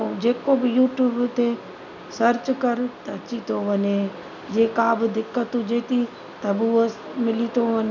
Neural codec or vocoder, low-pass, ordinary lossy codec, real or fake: none; 7.2 kHz; none; real